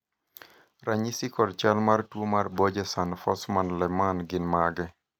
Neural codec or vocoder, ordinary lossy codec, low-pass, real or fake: none; none; none; real